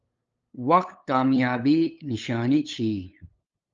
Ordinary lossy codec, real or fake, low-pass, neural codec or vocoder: Opus, 24 kbps; fake; 7.2 kHz; codec, 16 kHz, 8 kbps, FunCodec, trained on LibriTTS, 25 frames a second